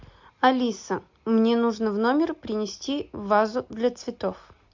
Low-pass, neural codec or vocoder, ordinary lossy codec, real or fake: 7.2 kHz; none; MP3, 64 kbps; real